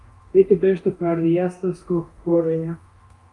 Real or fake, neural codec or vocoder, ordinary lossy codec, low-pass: fake; codec, 24 kHz, 0.9 kbps, DualCodec; Opus, 32 kbps; 10.8 kHz